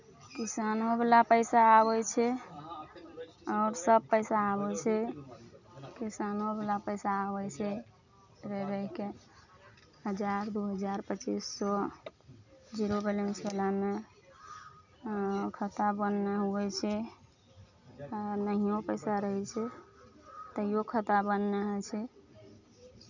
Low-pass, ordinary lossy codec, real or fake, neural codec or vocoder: 7.2 kHz; none; real; none